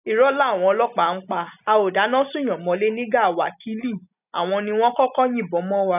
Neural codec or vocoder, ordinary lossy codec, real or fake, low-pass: none; none; real; 3.6 kHz